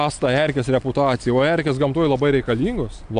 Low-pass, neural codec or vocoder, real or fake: 9.9 kHz; none; real